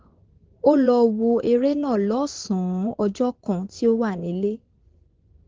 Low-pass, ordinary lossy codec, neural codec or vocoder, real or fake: 7.2 kHz; Opus, 16 kbps; vocoder, 24 kHz, 100 mel bands, Vocos; fake